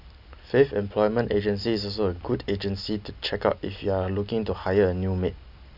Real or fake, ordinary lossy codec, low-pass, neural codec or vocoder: real; none; 5.4 kHz; none